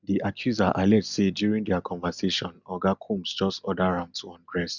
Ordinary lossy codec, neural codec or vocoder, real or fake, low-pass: none; none; real; 7.2 kHz